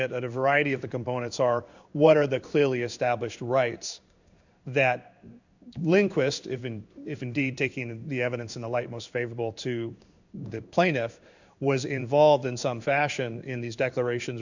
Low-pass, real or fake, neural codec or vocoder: 7.2 kHz; fake; codec, 16 kHz in and 24 kHz out, 1 kbps, XY-Tokenizer